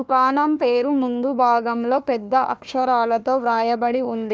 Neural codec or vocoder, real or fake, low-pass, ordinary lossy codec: codec, 16 kHz, 1 kbps, FunCodec, trained on Chinese and English, 50 frames a second; fake; none; none